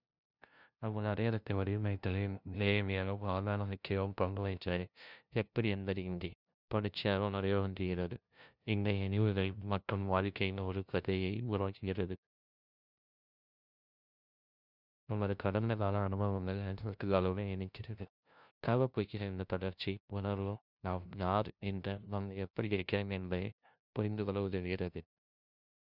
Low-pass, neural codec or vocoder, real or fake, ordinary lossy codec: 5.4 kHz; codec, 16 kHz, 0.5 kbps, FunCodec, trained on LibriTTS, 25 frames a second; fake; none